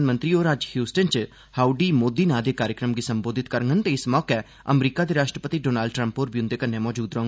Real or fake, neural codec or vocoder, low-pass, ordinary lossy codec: real; none; none; none